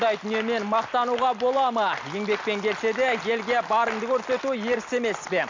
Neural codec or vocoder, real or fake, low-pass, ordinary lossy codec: none; real; 7.2 kHz; MP3, 64 kbps